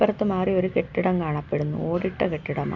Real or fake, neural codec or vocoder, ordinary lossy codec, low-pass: real; none; none; 7.2 kHz